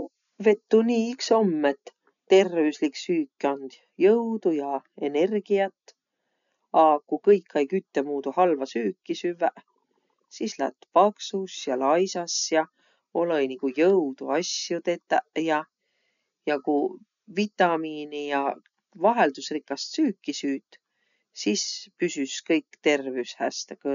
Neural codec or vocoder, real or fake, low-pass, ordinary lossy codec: none; real; 7.2 kHz; none